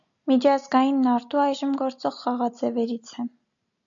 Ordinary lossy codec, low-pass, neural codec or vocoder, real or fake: MP3, 64 kbps; 7.2 kHz; none; real